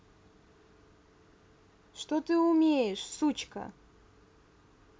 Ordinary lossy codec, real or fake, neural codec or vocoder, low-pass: none; real; none; none